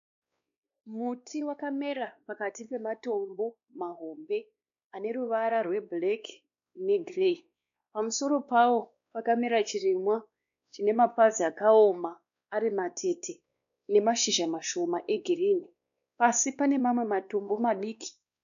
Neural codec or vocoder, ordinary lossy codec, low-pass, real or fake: codec, 16 kHz, 2 kbps, X-Codec, WavLM features, trained on Multilingual LibriSpeech; AAC, 96 kbps; 7.2 kHz; fake